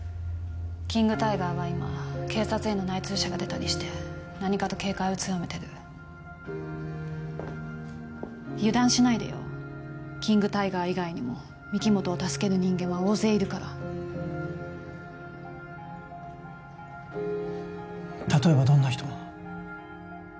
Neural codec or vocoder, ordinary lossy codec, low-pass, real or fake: none; none; none; real